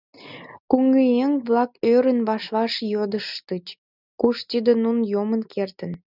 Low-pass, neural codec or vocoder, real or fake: 5.4 kHz; none; real